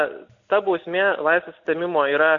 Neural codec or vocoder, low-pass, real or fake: none; 7.2 kHz; real